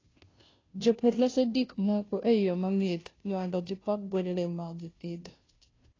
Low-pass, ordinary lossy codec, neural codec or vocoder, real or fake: 7.2 kHz; AAC, 32 kbps; codec, 16 kHz, 0.5 kbps, FunCodec, trained on Chinese and English, 25 frames a second; fake